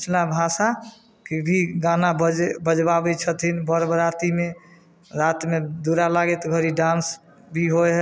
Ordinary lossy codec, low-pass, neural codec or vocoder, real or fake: none; none; none; real